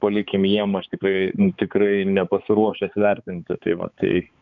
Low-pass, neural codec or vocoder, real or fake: 7.2 kHz; codec, 16 kHz, 4 kbps, X-Codec, HuBERT features, trained on balanced general audio; fake